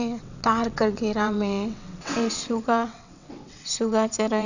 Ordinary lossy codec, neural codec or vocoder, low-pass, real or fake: none; vocoder, 22.05 kHz, 80 mel bands, WaveNeXt; 7.2 kHz; fake